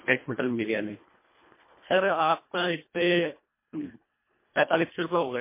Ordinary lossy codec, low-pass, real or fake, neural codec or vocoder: MP3, 24 kbps; 3.6 kHz; fake; codec, 24 kHz, 1.5 kbps, HILCodec